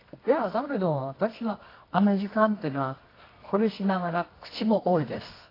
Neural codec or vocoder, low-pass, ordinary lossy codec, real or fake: codec, 24 kHz, 0.9 kbps, WavTokenizer, medium music audio release; 5.4 kHz; AAC, 24 kbps; fake